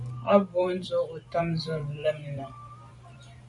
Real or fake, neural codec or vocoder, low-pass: fake; vocoder, 44.1 kHz, 128 mel bands every 512 samples, BigVGAN v2; 10.8 kHz